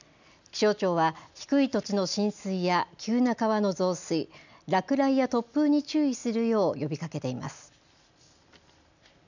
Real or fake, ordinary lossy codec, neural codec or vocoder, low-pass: real; none; none; 7.2 kHz